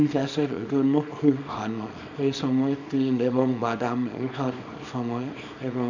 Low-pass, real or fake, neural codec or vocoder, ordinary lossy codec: 7.2 kHz; fake; codec, 24 kHz, 0.9 kbps, WavTokenizer, small release; none